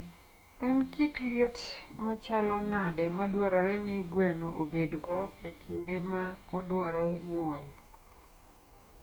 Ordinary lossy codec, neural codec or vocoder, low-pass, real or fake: none; codec, 44.1 kHz, 2.6 kbps, DAC; none; fake